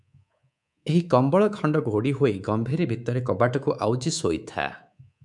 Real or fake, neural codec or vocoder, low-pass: fake; codec, 24 kHz, 3.1 kbps, DualCodec; 10.8 kHz